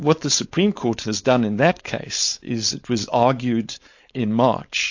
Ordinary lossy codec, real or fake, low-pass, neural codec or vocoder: AAC, 48 kbps; fake; 7.2 kHz; codec, 16 kHz, 4.8 kbps, FACodec